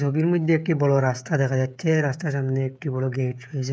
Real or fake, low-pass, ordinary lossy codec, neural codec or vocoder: fake; none; none; codec, 16 kHz, 16 kbps, FreqCodec, smaller model